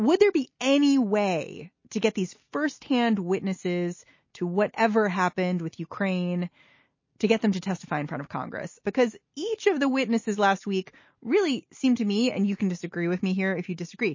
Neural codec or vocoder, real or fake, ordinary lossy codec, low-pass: none; real; MP3, 32 kbps; 7.2 kHz